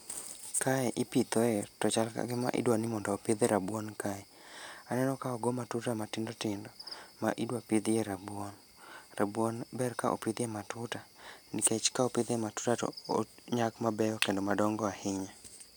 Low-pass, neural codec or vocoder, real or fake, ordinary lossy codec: none; none; real; none